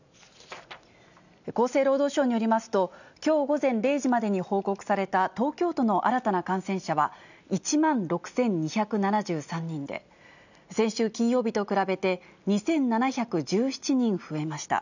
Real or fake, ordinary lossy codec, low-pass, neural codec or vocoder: real; none; 7.2 kHz; none